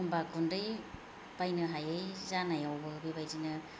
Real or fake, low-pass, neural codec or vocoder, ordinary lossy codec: real; none; none; none